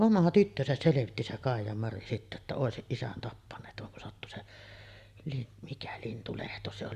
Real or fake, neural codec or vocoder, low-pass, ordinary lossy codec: real; none; 14.4 kHz; Opus, 64 kbps